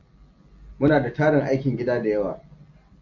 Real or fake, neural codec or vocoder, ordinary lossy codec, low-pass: real; none; AAC, 48 kbps; 7.2 kHz